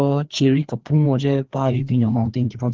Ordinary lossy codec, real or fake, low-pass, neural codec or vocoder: Opus, 16 kbps; fake; 7.2 kHz; codec, 16 kHz, 2 kbps, FreqCodec, larger model